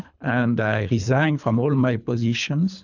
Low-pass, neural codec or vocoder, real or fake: 7.2 kHz; codec, 24 kHz, 3 kbps, HILCodec; fake